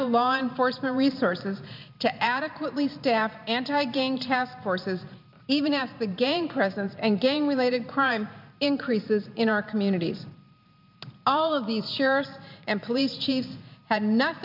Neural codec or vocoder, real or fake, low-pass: vocoder, 44.1 kHz, 128 mel bands every 512 samples, BigVGAN v2; fake; 5.4 kHz